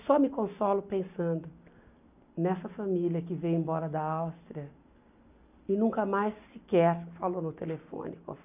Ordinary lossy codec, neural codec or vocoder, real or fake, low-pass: none; none; real; 3.6 kHz